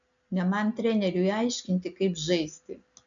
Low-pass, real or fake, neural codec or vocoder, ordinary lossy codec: 7.2 kHz; real; none; MP3, 96 kbps